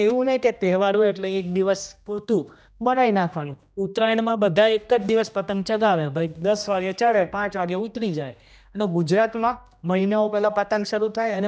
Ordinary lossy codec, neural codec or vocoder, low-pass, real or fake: none; codec, 16 kHz, 1 kbps, X-Codec, HuBERT features, trained on general audio; none; fake